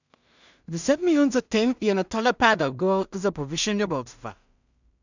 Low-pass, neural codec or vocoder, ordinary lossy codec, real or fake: 7.2 kHz; codec, 16 kHz in and 24 kHz out, 0.4 kbps, LongCat-Audio-Codec, two codebook decoder; none; fake